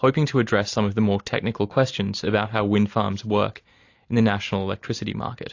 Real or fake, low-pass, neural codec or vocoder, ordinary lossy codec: real; 7.2 kHz; none; AAC, 48 kbps